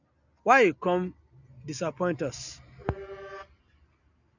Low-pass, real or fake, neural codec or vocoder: 7.2 kHz; real; none